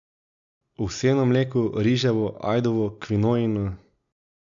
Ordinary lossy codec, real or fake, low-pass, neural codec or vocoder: none; real; 7.2 kHz; none